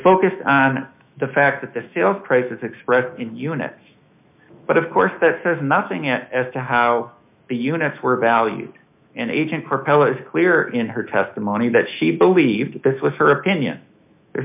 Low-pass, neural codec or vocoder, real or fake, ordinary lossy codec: 3.6 kHz; autoencoder, 48 kHz, 128 numbers a frame, DAC-VAE, trained on Japanese speech; fake; MP3, 32 kbps